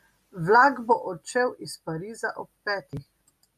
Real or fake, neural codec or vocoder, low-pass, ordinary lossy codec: real; none; 14.4 kHz; AAC, 96 kbps